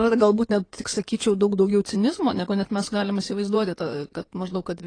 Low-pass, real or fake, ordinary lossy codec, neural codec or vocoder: 9.9 kHz; fake; AAC, 32 kbps; codec, 16 kHz in and 24 kHz out, 2.2 kbps, FireRedTTS-2 codec